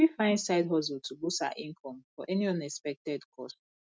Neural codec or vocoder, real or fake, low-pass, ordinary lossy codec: none; real; none; none